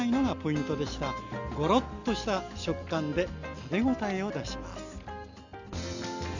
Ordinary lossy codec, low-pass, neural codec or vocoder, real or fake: none; 7.2 kHz; none; real